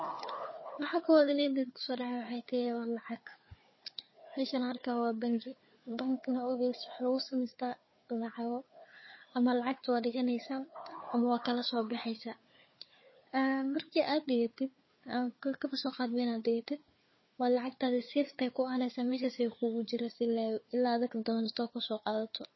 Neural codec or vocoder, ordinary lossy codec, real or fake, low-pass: codec, 16 kHz, 4 kbps, FunCodec, trained on Chinese and English, 50 frames a second; MP3, 24 kbps; fake; 7.2 kHz